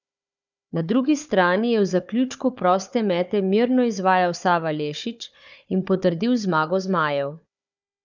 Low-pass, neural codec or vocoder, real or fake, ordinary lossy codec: 7.2 kHz; codec, 16 kHz, 4 kbps, FunCodec, trained on Chinese and English, 50 frames a second; fake; none